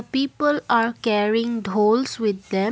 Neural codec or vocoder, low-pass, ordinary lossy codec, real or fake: none; none; none; real